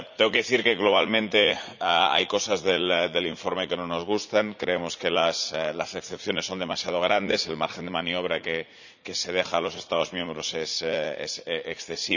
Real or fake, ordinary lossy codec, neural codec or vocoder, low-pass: fake; none; vocoder, 44.1 kHz, 80 mel bands, Vocos; 7.2 kHz